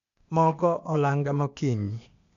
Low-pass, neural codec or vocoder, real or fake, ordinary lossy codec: 7.2 kHz; codec, 16 kHz, 0.8 kbps, ZipCodec; fake; MP3, 64 kbps